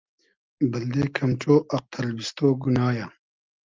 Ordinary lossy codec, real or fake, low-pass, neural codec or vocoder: Opus, 24 kbps; real; 7.2 kHz; none